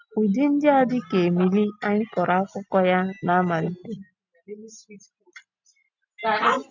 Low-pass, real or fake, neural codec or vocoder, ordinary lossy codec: 7.2 kHz; real; none; none